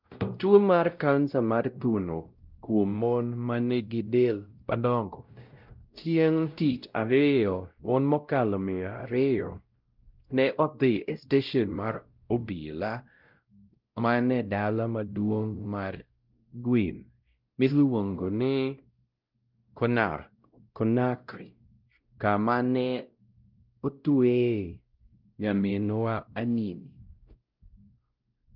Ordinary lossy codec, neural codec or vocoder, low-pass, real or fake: Opus, 24 kbps; codec, 16 kHz, 0.5 kbps, X-Codec, WavLM features, trained on Multilingual LibriSpeech; 5.4 kHz; fake